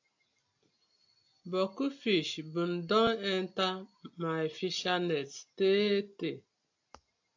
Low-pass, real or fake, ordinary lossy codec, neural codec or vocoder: 7.2 kHz; real; AAC, 48 kbps; none